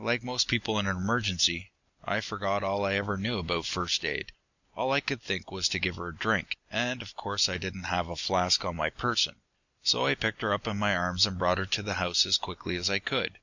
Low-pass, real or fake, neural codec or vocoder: 7.2 kHz; real; none